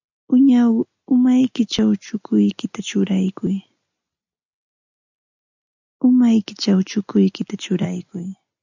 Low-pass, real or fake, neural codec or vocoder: 7.2 kHz; real; none